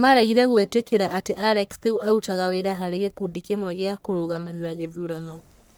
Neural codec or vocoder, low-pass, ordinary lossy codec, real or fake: codec, 44.1 kHz, 1.7 kbps, Pupu-Codec; none; none; fake